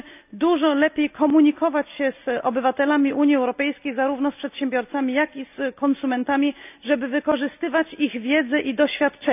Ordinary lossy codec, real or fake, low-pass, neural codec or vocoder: none; real; 3.6 kHz; none